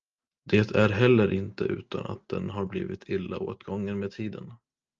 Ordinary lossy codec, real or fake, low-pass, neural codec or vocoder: Opus, 24 kbps; real; 7.2 kHz; none